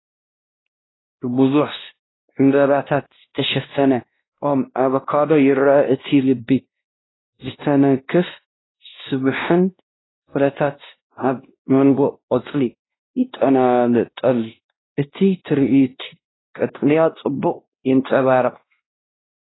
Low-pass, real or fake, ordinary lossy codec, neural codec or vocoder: 7.2 kHz; fake; AAC, 16 kbps; codec, 16 kHz, 1 kbps, X-Codec, WavLM features, trained on Multilingual LibriSpeech